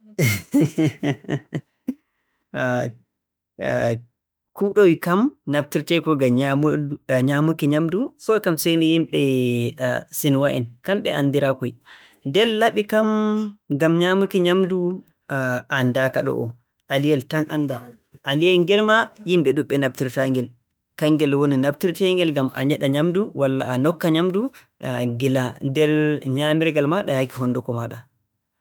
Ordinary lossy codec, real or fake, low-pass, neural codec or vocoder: none; fake; none; autoencoder, 48 kHz, 32 numbers a frame, DAC-VAE, trained on Japanese speech